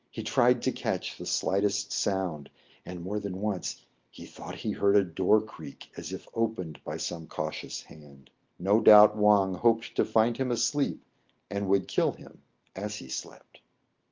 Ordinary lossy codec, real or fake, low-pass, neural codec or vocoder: Opus, 24 kbps; real; 7.2 kHz; none